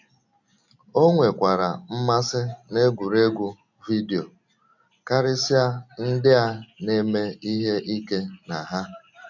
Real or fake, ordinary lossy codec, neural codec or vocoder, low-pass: real; none; none; 7.2 kHz